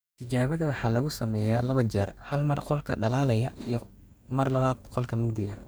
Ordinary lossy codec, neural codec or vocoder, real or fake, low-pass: none; codec, 44.1 kHz, 2.6 kbps, DAC; fake; none